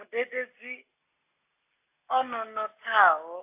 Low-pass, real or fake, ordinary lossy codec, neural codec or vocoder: 3.6 kHz; real; AAC, 24 kbps; none